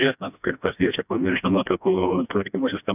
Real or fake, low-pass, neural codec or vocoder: fake; 3.6 kHz; codec, 16 kHz, 1 kbps, FreqCodec, smaller model